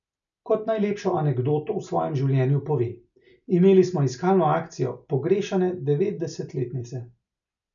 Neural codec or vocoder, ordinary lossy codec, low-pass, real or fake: none; none; 7.2 kHz; real